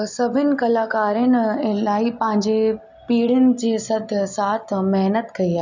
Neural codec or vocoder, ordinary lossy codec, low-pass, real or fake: none; none; 7.2 kHz; real